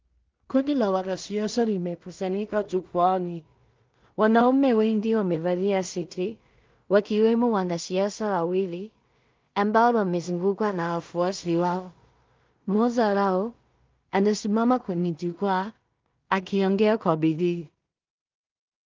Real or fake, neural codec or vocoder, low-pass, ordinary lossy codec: fake; codec, 16 kHz in and 24 kHz out, 0.4 kbps, LongCat-Audio-Codec, two codebook decoder; 7.2 kHz; Opus, 24 kbps